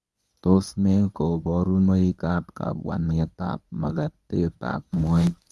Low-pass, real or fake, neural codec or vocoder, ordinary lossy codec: none; fake; codec, 24 kHz, 0.9 kbps, WavTokenizer, medium speech release version 1; none